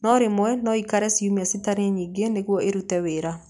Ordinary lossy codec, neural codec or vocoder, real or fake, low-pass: none; none; real; 10.8 kHz